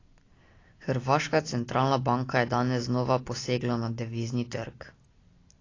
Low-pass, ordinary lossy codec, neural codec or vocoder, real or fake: 7.2 kHz; AAC, 32 kbps; none; real